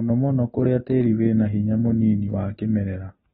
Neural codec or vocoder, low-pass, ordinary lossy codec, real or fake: none; 10.8 kHz; AAC, 16 kbps; real